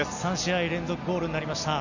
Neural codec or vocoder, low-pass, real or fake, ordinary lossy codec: none; 7.2 kHz; real; none